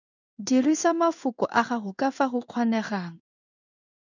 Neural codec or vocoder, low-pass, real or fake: codec, 16 kHz in and 24 kHz out, 1 kbps, XY-Tokenizer; 7.2 kHz; fake